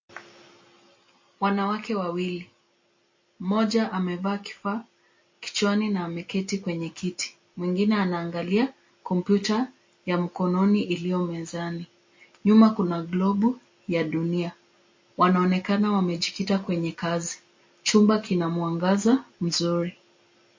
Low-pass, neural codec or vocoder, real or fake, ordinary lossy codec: 7.2 kHz; none; real; MP3, 32 kbps